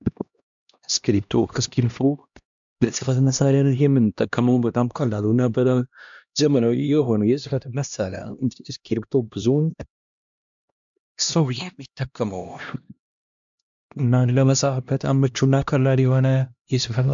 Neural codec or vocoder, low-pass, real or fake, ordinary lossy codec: codec, 16 kHz, 1 kbps, X-Codec, HuBERT features, trained on LibriSpeech; 7.2 kHz; fake; MP3, 64 kbps